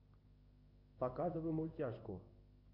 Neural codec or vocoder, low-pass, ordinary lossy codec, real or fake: codec, 16 kHz in and 24 kHz out, 1 kbps, XY-Tokenizer; 5.4 kHz; Opus, 32 kbps; fake